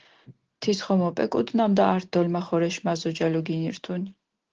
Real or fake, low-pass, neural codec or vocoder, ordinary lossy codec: real; 7.2 kHz; none; Opus, 16 kbps